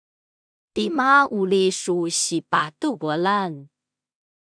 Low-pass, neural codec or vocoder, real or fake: 9.9 kHz; codec, 16 kHz in and 24 kHz out, 0.4 kbps, LongCat-Audio-Codec, two codebook decoder; fake